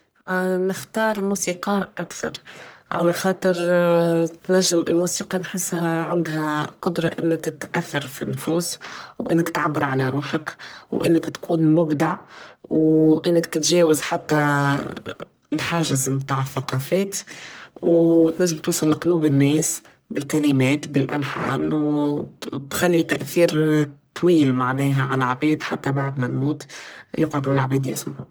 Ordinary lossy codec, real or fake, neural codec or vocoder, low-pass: none; fake; codec, 44.1 kHz, 1.7 kbps, Pupu-Codec; none